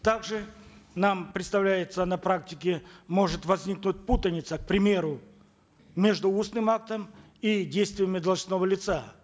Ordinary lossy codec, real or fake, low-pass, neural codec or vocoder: none; real; none; none